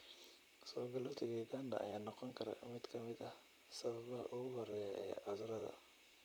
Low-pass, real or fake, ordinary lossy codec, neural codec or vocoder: none; fake; none; vocoder, 44.1 kHz, 128 mel bands, Pupu-Vocoder